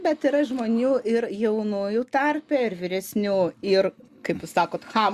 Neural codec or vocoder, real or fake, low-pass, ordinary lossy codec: none; real; 14.4 kHz; Opus, 64 kbps